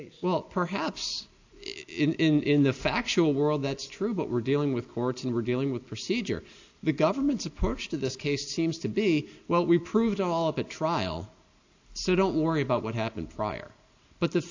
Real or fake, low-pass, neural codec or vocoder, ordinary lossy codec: real; 7.2 kHz; none; AAC, 48 kbps